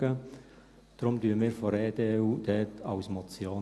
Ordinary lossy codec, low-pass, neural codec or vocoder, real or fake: none; none; none; real